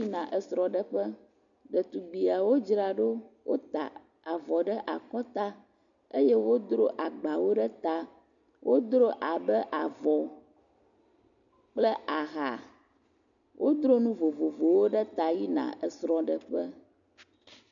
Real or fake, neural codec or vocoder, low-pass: real; none; 7.2 kHz